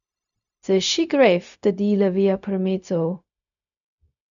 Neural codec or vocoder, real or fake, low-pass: codec, 16 kHz, 0.4 kbps, LongCat-Audio-Codec; fake; 7.2 kHz